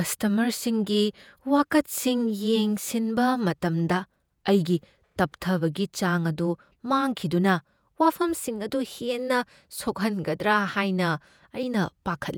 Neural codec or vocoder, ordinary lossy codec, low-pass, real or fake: vocoder, 48 kHz, 128 mel bands, Vocos; none; none; fake